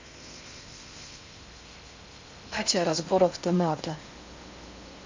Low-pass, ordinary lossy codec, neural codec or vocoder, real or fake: 7.2 kHz; MP3, 48 kbps; codec, 16 kHz in and 24 kHz out, 0.6 kbps, FocalCodec, streaming, 4096 codes; fake